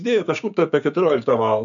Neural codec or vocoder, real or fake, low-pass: codec, 16 kHz, 6 kbps, DAC; fake; 7.2 kHz